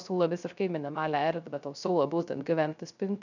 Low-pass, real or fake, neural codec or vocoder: 7.2 kHz; fake; codec, 16 kHz, 0.3 kbps, FocalCodec